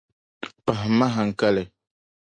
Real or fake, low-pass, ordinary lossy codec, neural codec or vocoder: real; 9.9 kHz; MP3, 48 kbps; none